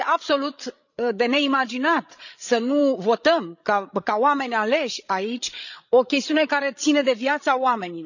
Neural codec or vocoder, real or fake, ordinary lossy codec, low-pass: codec, 16 kHz, 16 kbps, FreqCodec, larger model; fake; none; 7.2 kHz